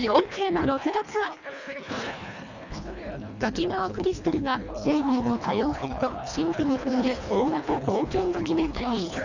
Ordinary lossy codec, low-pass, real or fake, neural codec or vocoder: none; 7.2 kHz; fake; codec, 24 kHz, 1.5 kbps, HILCodec